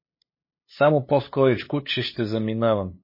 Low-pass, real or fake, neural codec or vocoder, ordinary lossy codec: 5.4 kHz; fake; codec, 16 kHz, 8 kbps, FunCodec, trained on LibriTTS, 25 frames a second; MP3, 24 kbps